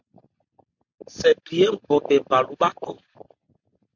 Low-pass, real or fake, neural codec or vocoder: 7.2 kHz; real; none